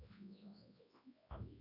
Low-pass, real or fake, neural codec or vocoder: 5.4 kHz; fake; codec, 24 kHz, 0.9 kbps, WavTokenizer, large speech release